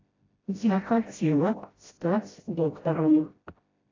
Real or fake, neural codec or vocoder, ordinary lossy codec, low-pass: fake; codec, 16 kHz, 0.5 kbps, FreqCodec, smaller model; AAC, 32 kbps; 7.2 kHz